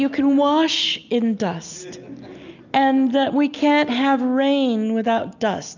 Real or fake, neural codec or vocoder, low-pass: real; none; 7.2 kHz